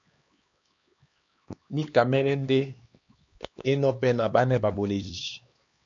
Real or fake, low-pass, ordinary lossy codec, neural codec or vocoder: fake; 7.2 kHz; AAC, 64 kbps; codec, 16 kHz, 2 kbps, X-Codec, HuBERT features, trained on LibriSpeech